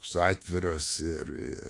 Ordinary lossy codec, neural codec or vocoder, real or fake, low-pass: AAC, 48 kbps; codec, 24 kHz, 1.2 kbps, DualCodec; fake; 10.8 kHz